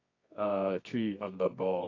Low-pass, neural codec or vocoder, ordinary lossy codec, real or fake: 7.2 kHz; codec, 24 kHz, 0.9 kbps, WavTokenizer, medium music audio release; AAC, 48 kbps; fake